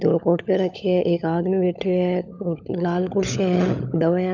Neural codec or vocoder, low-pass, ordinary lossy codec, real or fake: codec, 16 kHz, 16 kbps, FunCodec, trained on LibriTTS, 50 frames a second; 7.2 kHz; none; fake